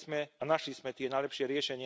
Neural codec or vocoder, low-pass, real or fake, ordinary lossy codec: none; none; real; none